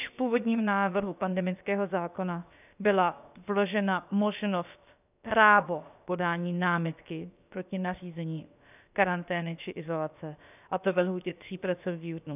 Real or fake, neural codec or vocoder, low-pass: fake; codec, 16 kHz, about 1 kbps, DyCAST, with the encoder's durations; 3.6 kHz